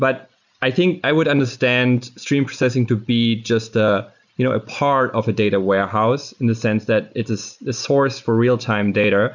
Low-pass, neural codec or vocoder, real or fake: 7.2 kHz; none; real